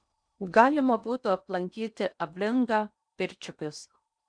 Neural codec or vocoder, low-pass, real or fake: codec, 16 kHz in and 24 kHz out, 0.8 kbps, FocalCodec, streaming, 65536 codes; 9.9 kHz; fake